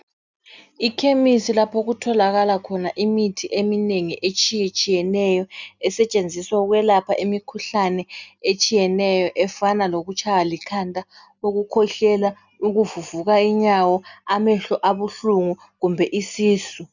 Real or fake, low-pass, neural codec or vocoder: real; 7.2 kHz; none